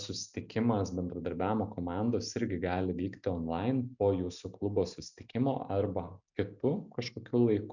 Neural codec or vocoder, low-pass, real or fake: none; 7.2 kHz; real